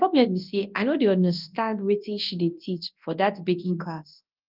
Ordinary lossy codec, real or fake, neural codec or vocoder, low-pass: Opus, 32 kbps; fake; codec, 24 kHz, 0.9 kbps, WavTokenizer, large speech release; 5.4 kHz